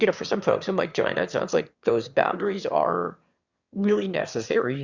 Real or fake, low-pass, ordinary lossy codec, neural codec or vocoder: fake; 7.2 kHz; Opus, 64 kbps; autoencoder, 22.05 kHz, a latent of 192 numbers a frame, VITS, trained on one speaker